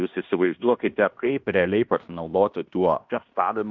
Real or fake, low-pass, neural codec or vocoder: fake; 7.2 kHz; codec, 16 kHz in and 24 kHz out, 0.9 kbps, LongCat-Audio-Codec, fine tuned four codebook decoder